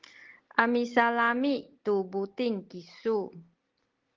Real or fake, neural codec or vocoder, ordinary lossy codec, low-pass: real; none; Opus, 16 kbps; 7.2 kHz